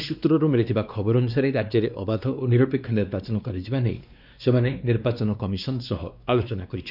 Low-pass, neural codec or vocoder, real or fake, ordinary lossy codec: 5.4 kHz; codec, 16 kHz, 2 kbps, X-Codec, WavLM features, trained on Multilingual LibriSpeech; fake; none